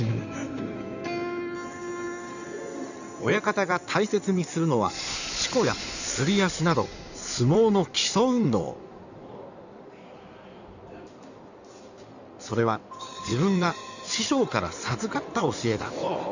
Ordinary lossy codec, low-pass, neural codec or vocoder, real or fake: none; 7.2 kHz; codec, 16 kHz in and 24 kHz out, 2.2 kbps, FireRedTTS-2 codec; fake